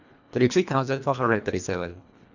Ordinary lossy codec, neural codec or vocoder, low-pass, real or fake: none; codec, 24 kHz, 1.5 kbps, HILCodec; 7.2 kHz; fake